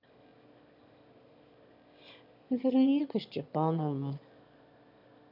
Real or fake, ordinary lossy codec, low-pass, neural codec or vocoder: fake; none; 5.4 kHz; autoencoder, 22.05 kHz, a latent of 192 numbers a frame, VITS, trained on one speaker